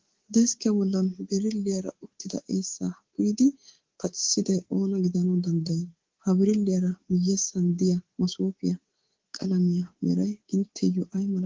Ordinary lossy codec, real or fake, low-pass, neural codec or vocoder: Opus, 16 kbps; fake; 7.2 kHz; codec, 24 kHz, 3.1 kbps, DualCodec